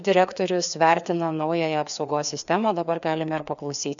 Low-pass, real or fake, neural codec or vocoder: 7.2 kHz; fake; codec, 16 kHz, 2 kbps, FreqCodec, larger model